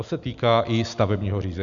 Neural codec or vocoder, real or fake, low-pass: none; real; 7.2 kHz